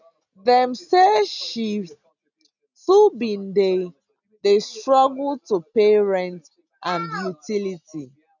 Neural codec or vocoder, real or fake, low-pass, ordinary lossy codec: none; real; 7.2 kHz; none